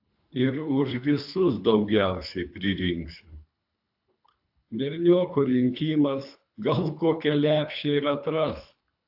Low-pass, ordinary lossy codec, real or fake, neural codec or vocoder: 5.4 kHz; AAC, 48 kbps; fake; codec, 24 kHz, 3 kbps, HILCodec